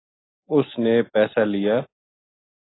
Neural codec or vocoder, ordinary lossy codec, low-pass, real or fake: none; AAC, 16 kbps; 7.2 kHz; real